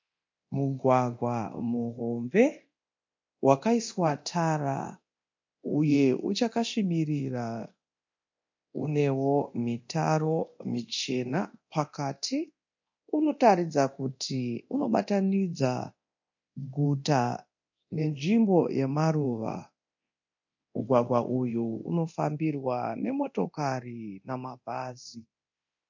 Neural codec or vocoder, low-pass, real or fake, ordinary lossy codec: codec, 24 kHz, 0.9 kbps, DualCodec; 7.2 kHz; fake; MP3, 48 kbps